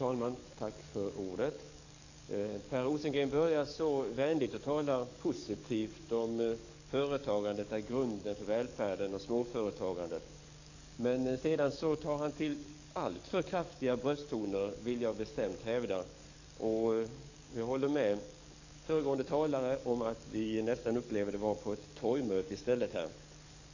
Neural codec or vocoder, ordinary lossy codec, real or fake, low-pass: codec, 44.1 kHz, 7.8 kbps, DAC; none; fake; 7.2 kHz